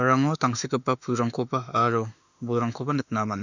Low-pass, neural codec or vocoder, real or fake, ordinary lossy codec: 7.2 kHz; autoencoder, 48 kHz, 32 numbers a frame, DAC-VAE, trained on Japanese speech; fake; none